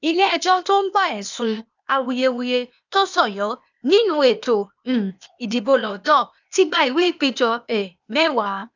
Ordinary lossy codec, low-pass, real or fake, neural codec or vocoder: none; 7.2 kHz; fake; codec, 16 kHz, 0.8 kbps, ZipCodec